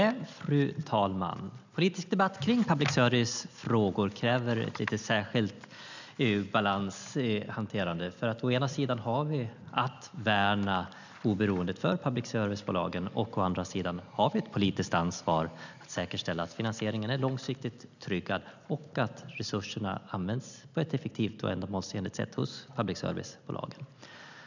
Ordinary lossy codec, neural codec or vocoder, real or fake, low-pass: none; none; real; 7.2 kHz